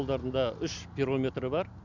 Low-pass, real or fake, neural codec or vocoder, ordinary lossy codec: 7.2 kHz; real; none; none